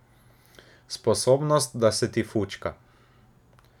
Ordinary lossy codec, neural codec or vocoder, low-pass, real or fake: none; none; 19.8 kHz; real